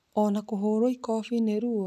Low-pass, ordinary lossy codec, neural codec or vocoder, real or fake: 14.4 kHz; none; none; real